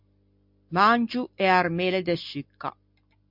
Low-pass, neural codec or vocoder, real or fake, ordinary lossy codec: 5.4 kHz; none; real; MP3, 48 kbps